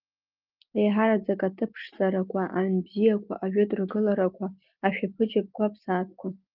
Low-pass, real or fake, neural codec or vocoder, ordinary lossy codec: 5.4 kHz; real; none; Opus, 32 kbps